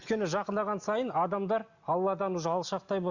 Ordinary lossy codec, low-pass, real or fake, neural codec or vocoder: Opus, 64 kbps; 7.2 kHz; real; none